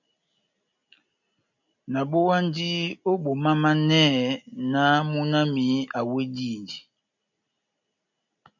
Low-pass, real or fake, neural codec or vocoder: 7.2 kHz; real; none